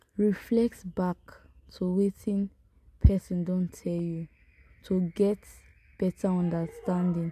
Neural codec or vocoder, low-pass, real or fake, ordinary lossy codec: none; 14.4 kHz; real; MP3, 96 kbps